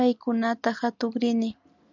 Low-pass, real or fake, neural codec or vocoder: 7.2 kHz; real; none